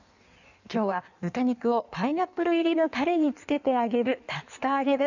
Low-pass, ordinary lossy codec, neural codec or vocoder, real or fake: 7.2 kHz; none; codec, 16 kHz in and 24 kHz out, 1.1 kbps, FireRedTTS-2 codec; fake